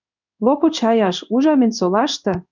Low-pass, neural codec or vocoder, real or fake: 7.2 kHz; codec, 16 kHz in and 24 kHz out, 1 kbps, XY-Tokenizer; fake